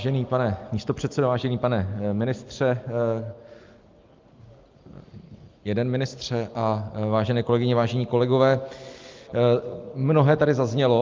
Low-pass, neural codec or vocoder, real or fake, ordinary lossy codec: 7.2 kHz; none; real; Opus, 32 kbps